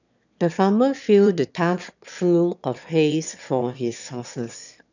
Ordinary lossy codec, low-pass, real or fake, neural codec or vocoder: none; 7.2 kHz; fake; autoencoder, 22.05 kHz, a latent of 192 numbers a frame, VITS, trained on one speaker